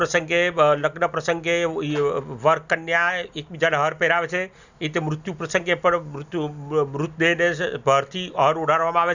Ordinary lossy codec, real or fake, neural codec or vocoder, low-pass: none; real; none; 7.2 kHz